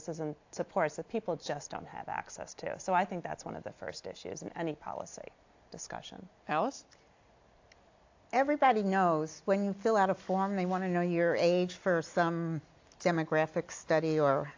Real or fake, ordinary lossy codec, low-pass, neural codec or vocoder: real; AAC, 48 kbps; 7.2 kHz; none